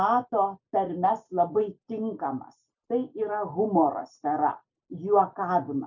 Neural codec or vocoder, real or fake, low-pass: none; real; 7.2 kHz